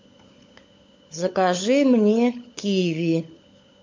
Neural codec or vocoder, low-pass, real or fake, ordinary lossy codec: codec, 16 kHz, 16 kbps, FunCodec, trained on LibriTTS, 50 frames a second; 7.2 kHz; fake; MP3, 48 kbps